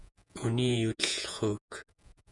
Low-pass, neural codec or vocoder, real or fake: 10.8 kHz; vocoder, 48 kHz, 128 mel bands, Vocos; fake